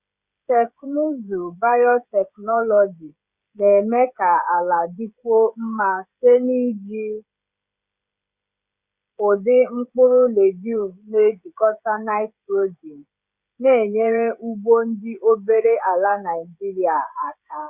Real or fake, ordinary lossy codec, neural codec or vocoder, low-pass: fake; Opus, 64 kbps; codec, 16 kHz, 16 kbps, FreqCodec, smaller model; 3.6 kHz